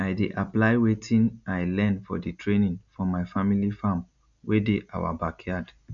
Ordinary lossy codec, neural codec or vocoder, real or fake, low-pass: none; none; real; 7.2 kHz